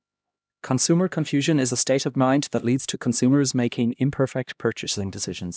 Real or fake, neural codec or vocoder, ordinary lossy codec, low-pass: fake; codec, 16 kHz, 1 kbps, X-Codec, HuBERT features, trained on LibriSpeech; none; none